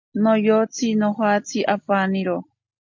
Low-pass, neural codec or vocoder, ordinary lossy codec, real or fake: 7.2 kHz; none; MP3, 48 kbps; real